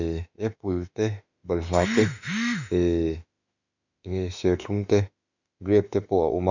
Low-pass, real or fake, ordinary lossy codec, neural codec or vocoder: 7.2 kHz; fake; none; autoencoder, 48 kHz, 32 numbers a frame, DAC-VAE, trained on Japanese speech